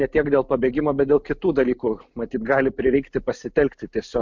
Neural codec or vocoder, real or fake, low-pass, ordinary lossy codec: none; real; 7.2 kHz; MP3, 64 kbps